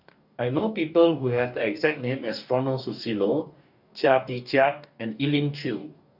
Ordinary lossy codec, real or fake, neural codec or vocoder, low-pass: none; fake; codec, 44.1 kHz, 2.6 kbps, DAC; 5.4 kHz